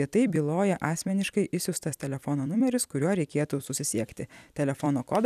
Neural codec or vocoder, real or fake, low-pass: vocoder, 44.1 kHz, 128 mel bands every 256 samples, BigVGAN v2; fake; 14.4 kHz